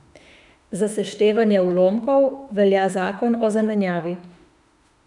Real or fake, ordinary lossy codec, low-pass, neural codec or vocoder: fake; none; 10.8 kHz; autoencoder, 48 kHz, 32 numbers a frame, DAC-VAE, trained on Japanese speech